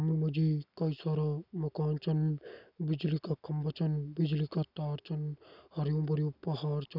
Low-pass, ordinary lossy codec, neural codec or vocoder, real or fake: 5.4 kHz; none; none; real